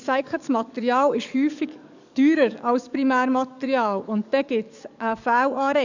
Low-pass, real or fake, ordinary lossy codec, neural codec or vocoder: 7.2 kHz; fake; none; codec, 44.1 kHz, 7.8 kbps, DAC